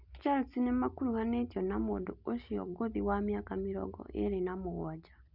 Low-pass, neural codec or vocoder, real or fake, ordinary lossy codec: 5.4 kHz; none; real; Opus, 64 kbps